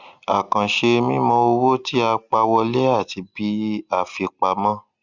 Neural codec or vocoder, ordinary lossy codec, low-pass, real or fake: none; Opus, 64 kbps; 7.2 kHz; real